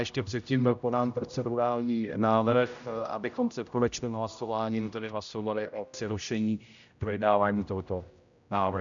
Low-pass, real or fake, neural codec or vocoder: 7.2 kHz; fake; codec, 16 kHz, 0.5 kbps, X-Codec, HuBERT features, trained on general audio